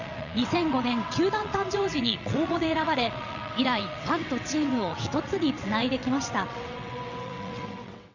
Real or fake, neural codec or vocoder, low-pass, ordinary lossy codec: fake; vocoder, 22.05 kHz, 80 mel bands, WaveNeXt; 7.2 kHz; none